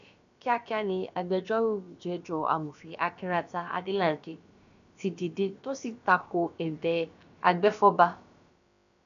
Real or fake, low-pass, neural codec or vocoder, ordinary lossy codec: fake; 7.2 kHz; codec, 16 kHz, about 1 kbps, DyCAST, with the encoder's durations; none